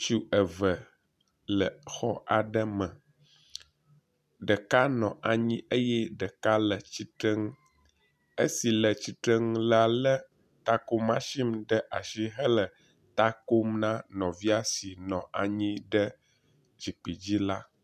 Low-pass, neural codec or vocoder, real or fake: 14.4 kHz; none; real